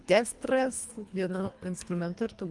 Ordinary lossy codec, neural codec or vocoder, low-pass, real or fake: Opus, 32 kbps; codec, 24 kHz, 1.5 kbps, HILCodec; 10.8 kHz; fake